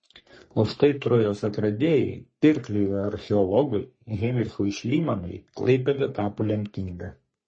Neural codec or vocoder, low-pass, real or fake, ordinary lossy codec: codec, 44.1 kHz, 3.4 kbps, Pupu-Codec; 9.9 kHz; fake; MP3, 32 kbps